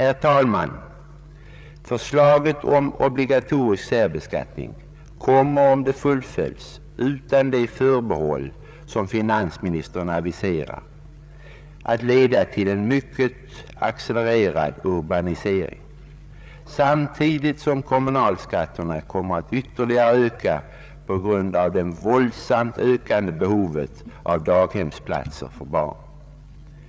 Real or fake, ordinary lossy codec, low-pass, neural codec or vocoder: fake; none; none; codec, 16 kHz, 8 kbps, FreqCodec, larger model